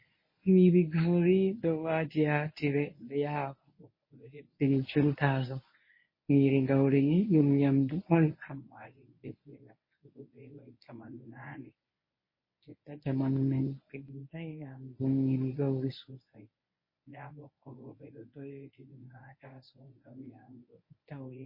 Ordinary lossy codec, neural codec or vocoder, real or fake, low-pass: MP3, 24 kbps; codec, 24 kHz, 0.9 kbps, WavTokenizer, medium speech release version 1; fake; 5.4 kHz